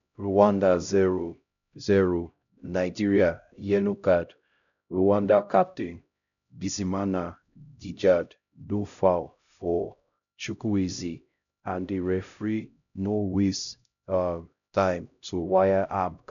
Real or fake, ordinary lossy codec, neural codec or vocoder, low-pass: fake; none; codec, 16 kHz, 0.5 kbps, X-Codec, HuBERT features, trained on LibriSpeech; 7.2 kHz